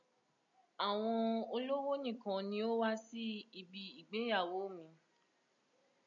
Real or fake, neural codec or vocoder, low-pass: real; none; 7.2 kHz